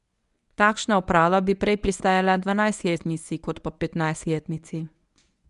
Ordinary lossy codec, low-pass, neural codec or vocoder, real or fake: none; 10.8 kHz; codec, 24 kHz, 0.9 kbps, WavTokenizer, medium speech release version 1; fake